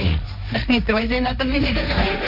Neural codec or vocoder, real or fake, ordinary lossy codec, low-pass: codec, 16 kHz, 1.1 kbps, Voila-Tokenizer; fake; AAC, 48 kbps; 5.4 kHz